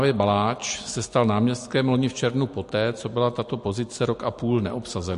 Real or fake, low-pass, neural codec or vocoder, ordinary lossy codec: real; 10.8 kHz; none; MP3, 48 kbps